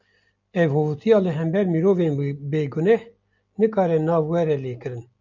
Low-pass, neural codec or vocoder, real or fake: 7.2 kHz; none; real